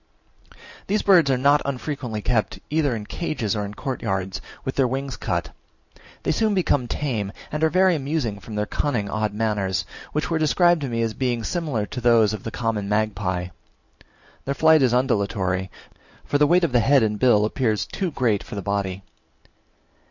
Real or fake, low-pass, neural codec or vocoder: real; 7.2 kHz; none